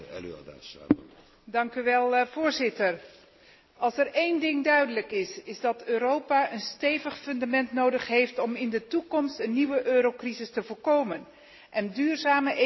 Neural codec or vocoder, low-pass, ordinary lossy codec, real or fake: none; 7.2 kHz; MP3, 24 kbps; real